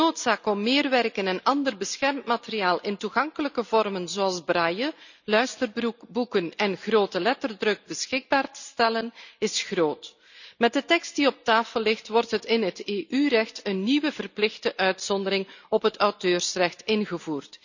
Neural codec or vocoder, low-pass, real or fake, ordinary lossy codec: none; 7.2 kHz; real; none